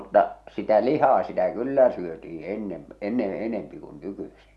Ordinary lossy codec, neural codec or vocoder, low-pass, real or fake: none; none; none; real